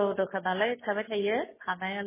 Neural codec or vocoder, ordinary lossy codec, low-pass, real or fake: none; MP3, 16 kbps; 3.6 kHz; real